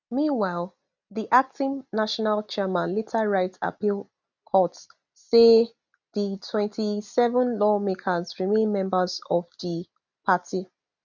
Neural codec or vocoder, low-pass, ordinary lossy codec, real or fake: none; 7.2 kHz; none; real